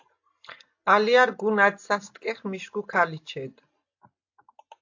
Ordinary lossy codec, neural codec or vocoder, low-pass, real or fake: AAC, 48 kbps; none; 7.2 kHz; real